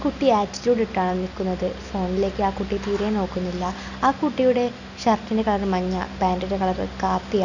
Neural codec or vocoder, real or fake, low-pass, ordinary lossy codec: none; real; 7.2 kHz; none